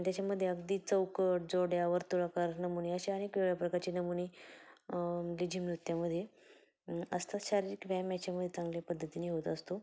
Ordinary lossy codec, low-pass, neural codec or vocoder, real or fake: none; none; none; real